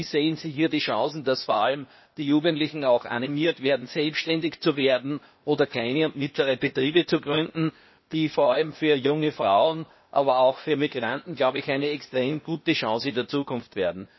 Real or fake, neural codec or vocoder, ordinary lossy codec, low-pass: fake; codec, 16 kHz, 0.8 kbps, ZipCodec; MP3, 24 kbps; 7.2 kHz